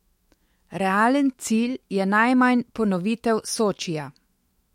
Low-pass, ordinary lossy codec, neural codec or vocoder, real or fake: 19.8 kHz; MP3, 64 kbps; autoencoder, 48 kHz, 128 numbers a frame, DAC-VAE, trained on Japanese speech; fake